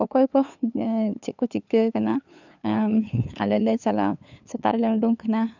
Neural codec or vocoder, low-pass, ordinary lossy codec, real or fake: codec, 16 kHz, 2 kbps, FunCodec, trained on LibriTTS, 25 frames a second; 7.2 kHz; none; fake